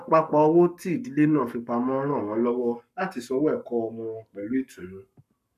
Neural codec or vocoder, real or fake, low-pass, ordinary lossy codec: codec, 44.1 kHz, 7.8 kbps, Pupu-Codec; fake; 14.4 kHz; none